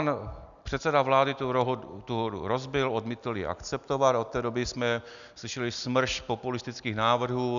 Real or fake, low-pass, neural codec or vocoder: real; 7.2 kHz; none